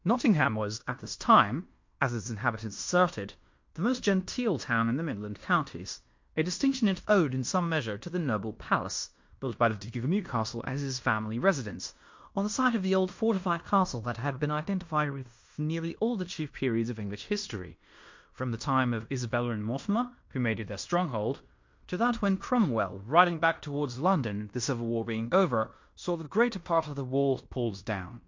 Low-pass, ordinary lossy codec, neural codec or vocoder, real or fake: 7.2 kHz; MP3, 48 kbps; codec, 16 kHz in and 24 kHz out, 0.9 kbps, LongCat-Audio-Codec, fine tuned four codebook decoder; fake